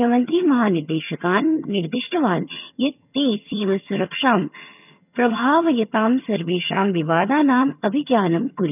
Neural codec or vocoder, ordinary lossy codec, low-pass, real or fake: vocoder, 22.05 kHz, 80 mel bands, HiFi-GAN; none; 3.6 kHz; fake